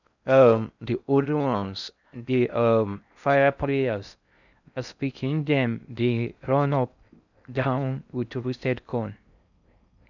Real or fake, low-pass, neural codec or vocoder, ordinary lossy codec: fake; 7.2 kHz; codec, 16 kHz in and 24 kHz out, 0.6 kbps, FocalCodec, streaming, 4096 codes; none